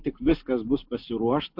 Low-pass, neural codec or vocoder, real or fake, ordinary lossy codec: 5.4 kHz; none; real; MP3, 48 kbps